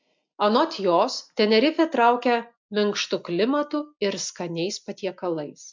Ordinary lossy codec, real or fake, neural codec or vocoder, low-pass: MP3, 64 kbps; real; none; 7.2 kHz